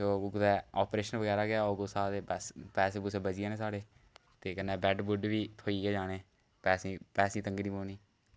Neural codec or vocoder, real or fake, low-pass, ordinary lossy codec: none; real; none; none